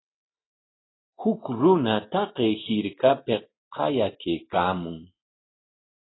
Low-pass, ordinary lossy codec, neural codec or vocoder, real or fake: 7.2 kHz; AAC, 16 kbps; none; real